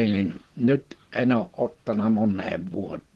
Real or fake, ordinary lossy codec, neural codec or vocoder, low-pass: fake; Opus, 16 kbps; vocoder, 44.1 kHz, 128 mel bands, Pupu-Vocoder; 19.8 kHz